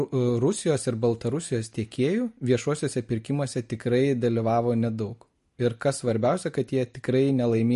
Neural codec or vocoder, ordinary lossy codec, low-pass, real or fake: none; MP3, 48 kbps; 14.4 kHz; real